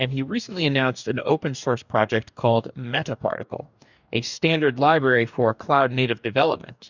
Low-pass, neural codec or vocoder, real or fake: 7.2 kHz; codec, 44.1 kHz, 2.6 kbps, DAC; fake